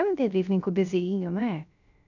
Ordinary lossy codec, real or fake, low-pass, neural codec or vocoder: none; fake; 7.2 kHz; codec, 16 kHz, 0.3 kbps, FocalCodec